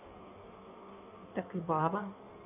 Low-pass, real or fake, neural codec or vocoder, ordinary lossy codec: 3.6 kHz; fake; codec, 16 kHz in and 24 kHz out, 1.1 kbps, FireRedTTS-2 codec; none